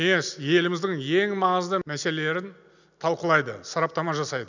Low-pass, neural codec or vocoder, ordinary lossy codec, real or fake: 7.2 kHz; none; none; real